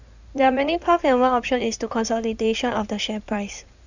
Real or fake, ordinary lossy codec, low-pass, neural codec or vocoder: fake; none; 7.2 kHz; codec, 16 kHz in and 24 kHz out, 2.2 kbps, FireRedTTS-2 codec